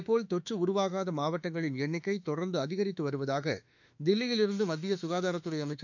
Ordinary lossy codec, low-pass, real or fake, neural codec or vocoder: none; 7.2 kHz; fake; autoencoder, 48 kHz, 32 numbers a frame, DAC-VAE, trained on Japanese speech